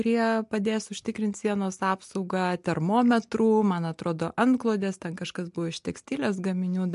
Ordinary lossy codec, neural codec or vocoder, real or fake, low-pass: MP3, 64 kbps; none; real; 10.8 kHz